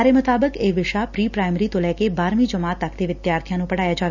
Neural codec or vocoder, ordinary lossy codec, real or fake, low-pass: none; none; real; 7.2 kHz